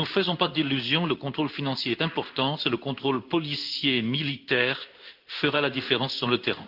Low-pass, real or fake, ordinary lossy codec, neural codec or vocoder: 5.4 kHz; fake; Opus, 24 kbps; codec, 16 kHz in and 24 kHz out, 1 kbps, XY-Tokenizer